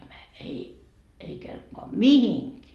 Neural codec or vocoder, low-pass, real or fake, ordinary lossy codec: none; 14.4 kHz; real; Opus, 32 kbps